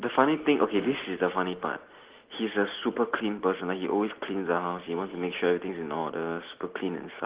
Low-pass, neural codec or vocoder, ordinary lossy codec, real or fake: 3.6 kHz; none; Opus, 16 kbps; real